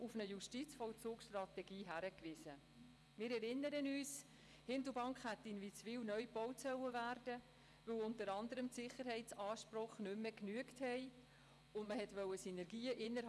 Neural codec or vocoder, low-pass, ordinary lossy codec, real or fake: vocoder, 24 kHz, 100 mel bands, Vocos; none; none; fake